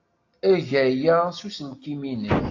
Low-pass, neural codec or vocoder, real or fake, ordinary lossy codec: 7.2 kHz; none; real; AAC, 48 kbps